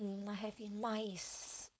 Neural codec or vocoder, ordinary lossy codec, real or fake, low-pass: codec, 16 kHz, 4.8 kbps, FACodec; none; fake; none